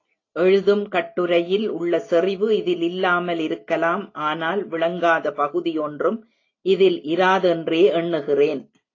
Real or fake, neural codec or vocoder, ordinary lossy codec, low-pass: real; none; AAC, 32 kbps; 7.2 kHz